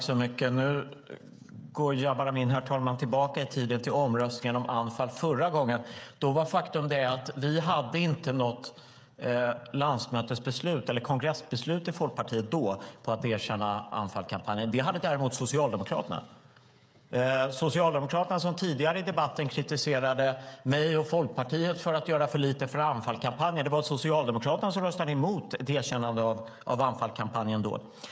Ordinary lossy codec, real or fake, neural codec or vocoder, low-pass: none; fake; codec, 16 kHz, 16 kbps, FreqCodec, smaller model; none